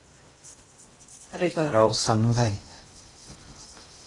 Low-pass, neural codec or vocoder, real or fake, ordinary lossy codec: 10.8 kHz; codec, 16 kHz in and 24 kHz out, 0.6 kbps, FocalCodec, streaming, 2048 codes; fake; AAC, 32 kbps